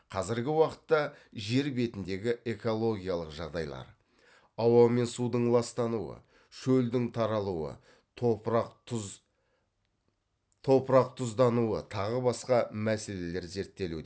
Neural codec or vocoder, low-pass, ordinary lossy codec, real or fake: none; none; none; real